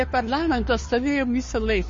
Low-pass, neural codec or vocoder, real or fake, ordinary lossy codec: 7.2 kHz; codec, 16 kHz, 2 kbps, FunCodec, trained on Chinese and English, 25 frames a second; fake; MP3, 32 kbps